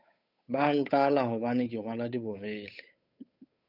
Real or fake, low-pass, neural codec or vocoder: fake; 5.4 kHz; codec, 16 kHz, 8 kbps, FunCodec, trained on Chinese and English, 25 frames a second